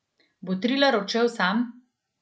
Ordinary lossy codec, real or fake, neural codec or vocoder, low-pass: none; real; none; none